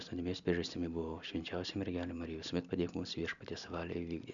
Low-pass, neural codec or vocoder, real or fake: 7.2 kHz; none; real